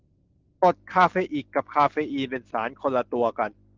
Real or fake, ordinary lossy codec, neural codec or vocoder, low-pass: real; Opus, 16 kbps; none; 7.2 kHz